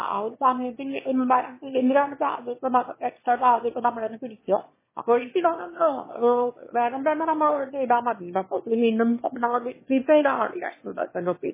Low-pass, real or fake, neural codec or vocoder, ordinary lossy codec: 3.6 kHz; fake; autoencoder, 22.05 kHz, a latent of 192 numbers a frame, VITS, trained on one speaker; MP3, 16 kbps